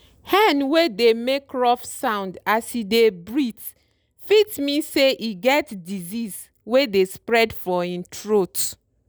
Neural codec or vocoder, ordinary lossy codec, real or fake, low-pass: none; none; real; none